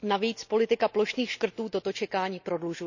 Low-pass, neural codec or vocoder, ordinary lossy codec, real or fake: 7.2 kHz; none; none; real